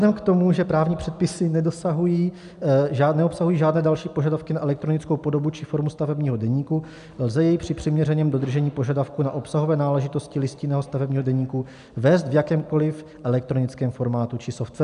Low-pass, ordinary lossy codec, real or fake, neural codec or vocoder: 10.8 kHz; MP3, 96 kbps; real; none